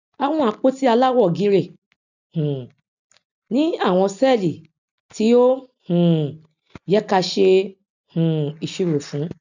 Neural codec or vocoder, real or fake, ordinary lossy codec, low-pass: none; real; none; 7.2 kHz